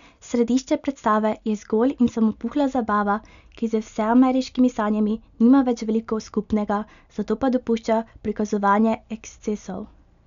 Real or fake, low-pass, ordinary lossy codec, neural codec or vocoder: real; 7.2 kHz; none; none